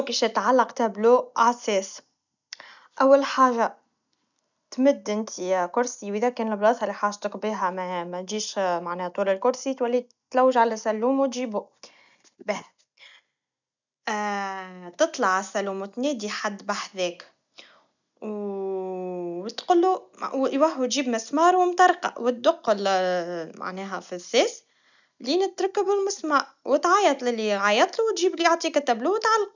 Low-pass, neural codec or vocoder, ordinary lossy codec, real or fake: 7.2 kHz; none; none; real